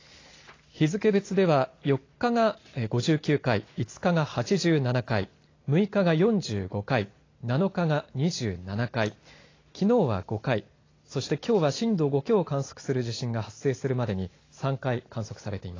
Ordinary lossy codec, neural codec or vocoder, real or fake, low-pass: AAC, 32 kbps; none; real; 7.2 kHz